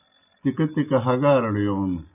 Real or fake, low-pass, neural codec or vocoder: real; 3.6 kHz; none